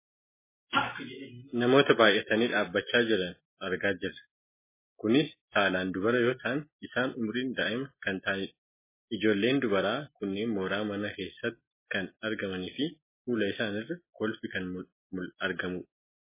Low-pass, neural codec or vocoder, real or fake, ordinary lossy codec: 3.6 kHz; none; real; MP3, 16 kbps